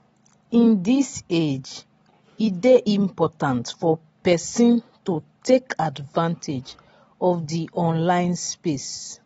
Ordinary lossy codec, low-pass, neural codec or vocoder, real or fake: AAC, 24 kbps; 19.8 kHz; none; real